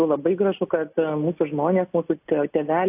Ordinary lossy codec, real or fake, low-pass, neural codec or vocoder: AAC, 32 kbps; real; 3.6 kHz; none